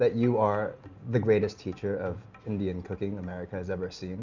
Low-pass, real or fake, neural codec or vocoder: 7.2 kHz; real; none